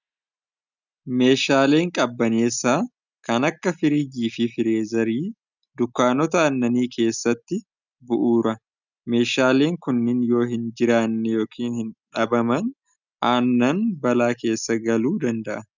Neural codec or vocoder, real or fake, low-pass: none; real; 7.2 kHz